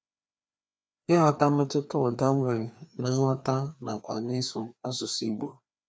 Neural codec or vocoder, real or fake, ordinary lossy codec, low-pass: codec, 16 kHz, 2 kbps, FreqCodec, larger model; fake; none; none